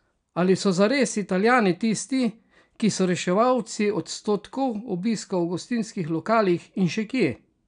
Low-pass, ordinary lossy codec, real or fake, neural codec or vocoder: 9.9 kHz; none; real; none